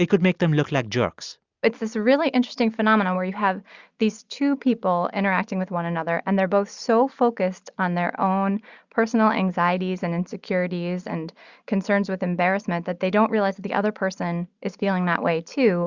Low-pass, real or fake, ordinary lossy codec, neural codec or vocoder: 7.2 kHz; real; Opus, 64 kbps; none